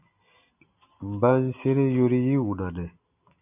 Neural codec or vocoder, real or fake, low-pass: none; real; 3.6 kHz